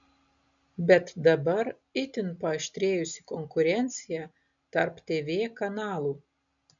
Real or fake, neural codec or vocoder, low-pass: real; none; 7.2 kHz